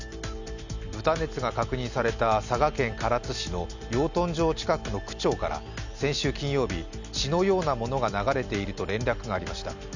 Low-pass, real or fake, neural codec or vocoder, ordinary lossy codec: 7.2 kHz; real; none; none